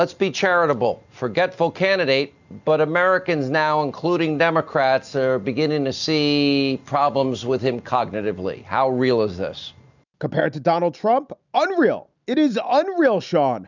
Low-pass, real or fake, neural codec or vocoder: 7.2 kHz; real; none